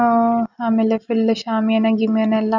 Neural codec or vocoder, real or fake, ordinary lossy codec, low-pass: none; real; none; 7.2 kHz